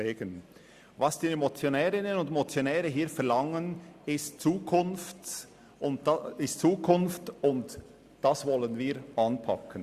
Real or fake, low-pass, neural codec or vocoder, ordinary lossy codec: real; 14.4 kHz; none; Opus, 64 kbps